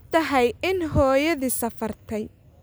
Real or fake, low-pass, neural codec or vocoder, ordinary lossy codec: real; none; none; none